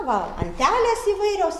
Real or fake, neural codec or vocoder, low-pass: real; none; 14.4 kHz